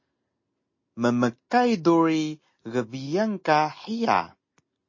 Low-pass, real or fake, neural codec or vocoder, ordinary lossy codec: 7.2 kHz; real; none; MP3, 32 kbps